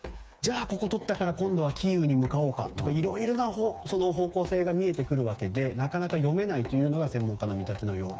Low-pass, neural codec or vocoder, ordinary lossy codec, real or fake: none; codec, 16 kHz, 4 kbps, FreqCodec, smaller model; none; fake